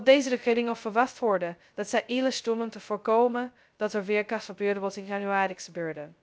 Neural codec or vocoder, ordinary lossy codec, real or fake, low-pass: codec, 16 kHz, 0.2 kbps, FocalCodec; none; fake; none